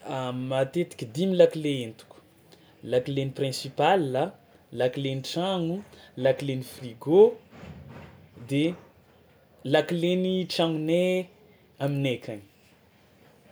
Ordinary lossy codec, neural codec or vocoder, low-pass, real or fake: none; none; none; real